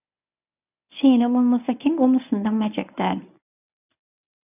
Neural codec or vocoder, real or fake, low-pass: codec, 24 kHz, 0.9 kbps, WavTokenizer, medium speech release version 1; fake; 3.6 kHz